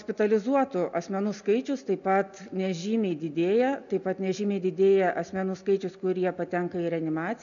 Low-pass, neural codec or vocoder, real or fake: 7.2 kHz; none; real